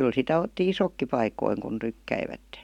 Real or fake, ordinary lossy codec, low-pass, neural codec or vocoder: real; none; 19.8 kHz; none